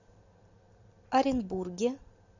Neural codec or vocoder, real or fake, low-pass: none; real; 7.2 kHz